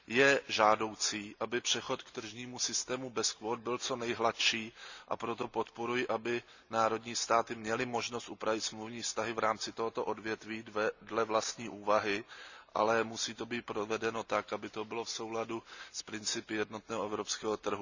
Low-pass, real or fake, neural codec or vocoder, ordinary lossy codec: 7.2 kHz; real; none; none